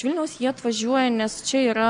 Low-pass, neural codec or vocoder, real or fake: 9.9 kHz; none; real